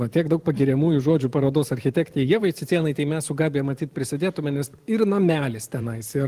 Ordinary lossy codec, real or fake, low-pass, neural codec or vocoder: Opus, 24 kbps; fake; 14.4 kHz; vocoder, 44.1 kHz, 128 mel bands every 512 samples, BigVGAN v2